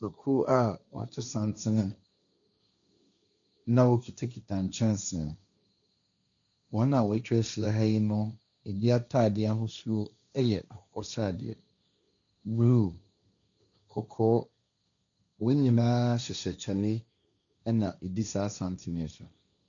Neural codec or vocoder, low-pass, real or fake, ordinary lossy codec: codec, 16 kHz, 1.1 kbps, Voila-Tokenizer; 7.2 kHz; fake; MP3, 96 kbps